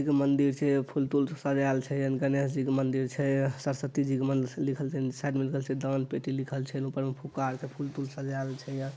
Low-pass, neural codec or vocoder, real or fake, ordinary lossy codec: none; none; real; none